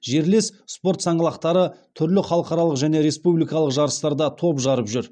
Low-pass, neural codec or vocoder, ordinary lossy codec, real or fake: 9.9 kHz; none; none; real